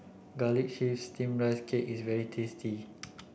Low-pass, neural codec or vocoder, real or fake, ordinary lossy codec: none; none; real; none